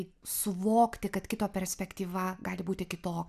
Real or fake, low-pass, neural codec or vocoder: real; 14.4 kHz; none